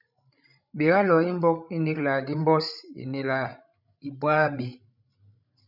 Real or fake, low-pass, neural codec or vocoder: fake; 5.4 kHz; codec, 16 kHz, 16 kbps, FreqCodec, larger model